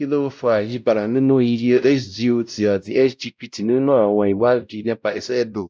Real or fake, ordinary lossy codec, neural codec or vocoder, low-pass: fake; none; codec, 16 kHz, 0.5 kbps, X-Codec, WavLM features, trained on Multilingual LibriSpeech; none